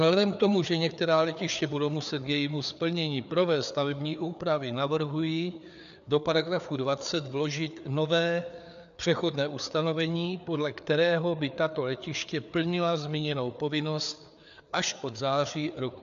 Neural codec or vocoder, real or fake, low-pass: codec, 16 kHz, 4 kbps, FreqCodec, larger model; fake; 7.2 kHz